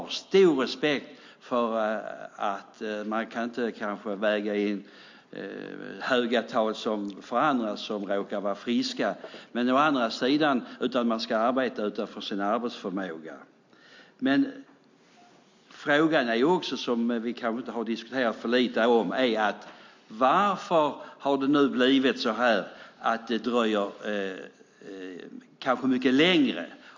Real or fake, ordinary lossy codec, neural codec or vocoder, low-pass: real; MP3, 48 kbps; none; 7.2 kHz